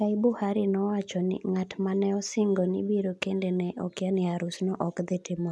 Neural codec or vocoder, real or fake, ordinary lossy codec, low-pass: none; real; none; none